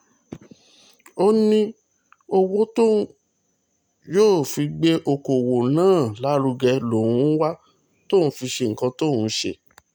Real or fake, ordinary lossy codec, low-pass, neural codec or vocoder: real; none; none; none